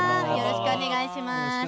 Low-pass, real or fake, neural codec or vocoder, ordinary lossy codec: none; real; none; none